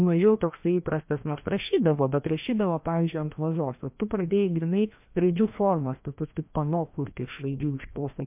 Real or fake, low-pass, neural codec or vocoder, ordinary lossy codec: fake; 3.6 kHz; codec, 16 kHz, 1 kbps, FreqCodec, larger model; MP3, 32 kbps